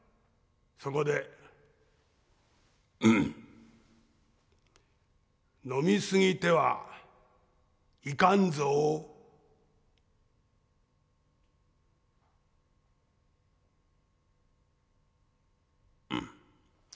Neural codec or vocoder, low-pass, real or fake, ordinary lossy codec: none; none; real; none